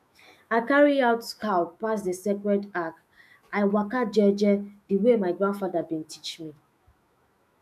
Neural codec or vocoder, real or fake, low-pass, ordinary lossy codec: autoencoder, 48 kHz, 128 numbers a frame, DAC-VAE, trained on Japanese speech; fake; 14.4 kHz; none